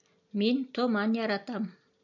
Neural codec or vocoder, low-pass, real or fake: none; 7.2 kHz; real